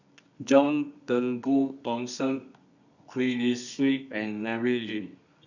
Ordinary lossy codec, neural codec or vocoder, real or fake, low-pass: none; codec, 24 kHz, 0.9 kbps, WavTokenizer, medium music audio release; fake; 7.2 kHz